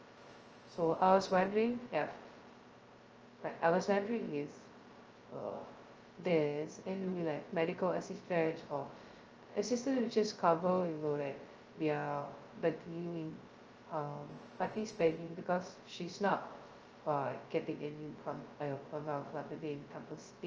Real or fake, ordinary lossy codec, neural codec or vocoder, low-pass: fake; Opus, 24 kbps; codec, 16 kHz, 0.2 kbps, FocalCodec; 7.2 kHz